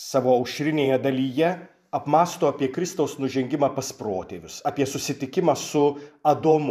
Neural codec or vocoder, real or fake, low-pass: vocoder, 44.1 kHz, 128 mel bands every 512 samples, BigVGAN v2; fake; 14.4 kHz